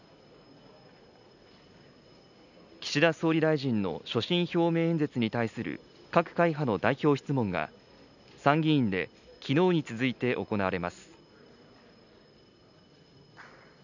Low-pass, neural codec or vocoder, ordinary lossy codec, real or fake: 7.2 kHz; none; none; real